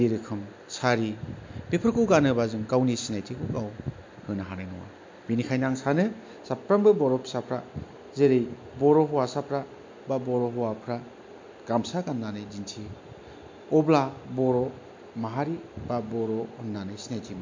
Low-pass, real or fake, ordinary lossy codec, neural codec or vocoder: 7.2 kHz; real; MP3, 48 kbps; none